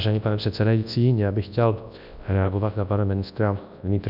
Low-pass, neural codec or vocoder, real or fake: 5.4 kHz; codec, 24 kHz, 0.9 kbps, WavTokenizer, large speech release; fake